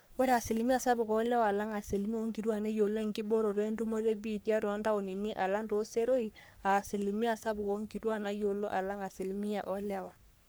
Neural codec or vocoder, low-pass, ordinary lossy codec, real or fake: codec, 44.1 kHz, 3.4 kbps, Pupu-Codec; none; none; fake